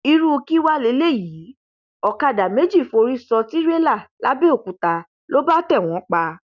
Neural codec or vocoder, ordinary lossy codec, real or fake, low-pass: none; none; real; 7.2 kHz